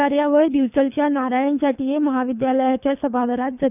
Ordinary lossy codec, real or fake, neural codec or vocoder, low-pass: none; fake; codec, 24 kHz, 3 kbps, HILCodec; 3.6 kHz